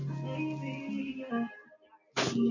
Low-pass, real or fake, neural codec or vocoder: 7.2 kHz; real; none